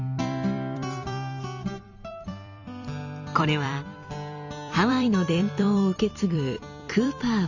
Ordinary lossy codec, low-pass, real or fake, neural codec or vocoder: none; 7.2 kHz; fake; vocoder, 44.1 kHz, 128 mel bands every 256 samples, BigVGAN v2